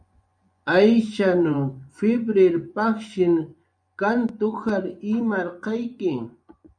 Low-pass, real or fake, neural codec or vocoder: 9.9 kHz; fake; vocoder, 24 kHz, 100 mel bands, Vocos